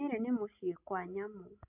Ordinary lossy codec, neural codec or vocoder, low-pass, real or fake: none; none; 3.6 kHz; real